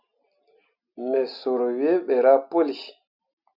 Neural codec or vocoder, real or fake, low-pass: none; real; 5.4 kHz